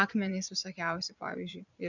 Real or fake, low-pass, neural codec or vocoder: fake; 7.2 kHz; vocoder, 22.05 kHz, 80 mel bands, Vocos